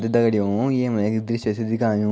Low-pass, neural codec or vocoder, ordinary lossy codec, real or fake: none; none; none; real